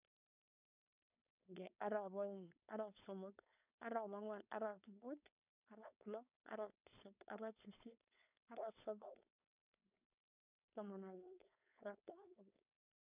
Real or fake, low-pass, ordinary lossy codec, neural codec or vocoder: fake; 3.6 kHz; none; codec, 16 kHz, 4.8 kbps, FACodec